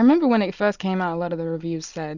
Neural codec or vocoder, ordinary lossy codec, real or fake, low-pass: none; Opus, 64 kbps; real; 7.2 kHz